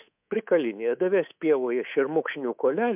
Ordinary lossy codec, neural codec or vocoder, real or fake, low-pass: MP3, 32 kbps; codec, 24 kHz, 3.1 kbps, DualCodec; fake; 3.6 kHz